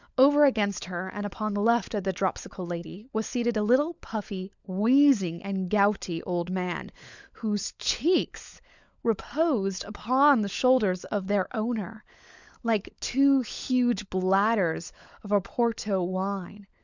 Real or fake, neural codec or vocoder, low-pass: fake; codec, 16 kHz, 16 kbps, FunCodec, trained on LibriTTS, 50 frames a second; 7.2 kHz